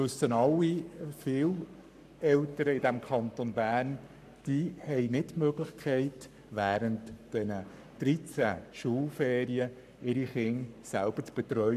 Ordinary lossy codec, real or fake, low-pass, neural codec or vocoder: none; fake; 14.4 kHz; codec, 44.1 kHz, 7.8 kbps, Pupu-Codec